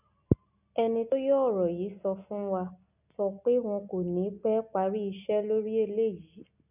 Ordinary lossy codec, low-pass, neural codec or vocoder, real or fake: none; 3.6 kHz; none; real